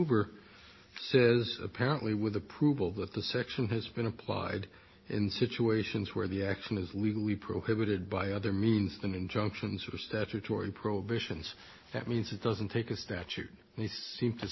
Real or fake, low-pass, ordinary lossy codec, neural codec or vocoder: real; 7.2 kHz; MP3, 24 kbps; none